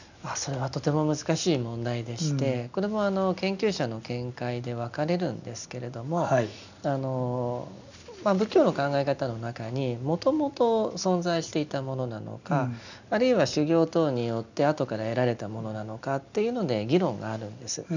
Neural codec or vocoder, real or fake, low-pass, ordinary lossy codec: none; real; 7.2 kHz; none